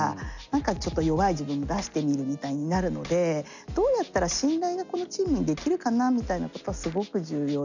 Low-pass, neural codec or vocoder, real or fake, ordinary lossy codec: 7.2 kHz; none; real; none